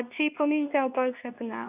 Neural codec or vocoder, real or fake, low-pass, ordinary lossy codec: codec, 24 kHz, 0.9 kbps, WavTokenizer, medium speech release version 2; fake; 3.6 kHz; none